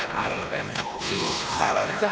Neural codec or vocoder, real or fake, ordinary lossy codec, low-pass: codec, 16 kHz, 1 kbps, X-Codec, WavLM features, trained on Multilingual LibriSpeech; fake; none; none